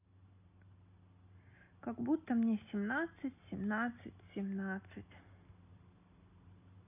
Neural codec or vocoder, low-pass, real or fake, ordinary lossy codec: none; 3.6 kHz; real; none